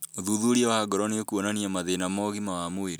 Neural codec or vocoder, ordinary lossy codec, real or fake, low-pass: none; none; real; none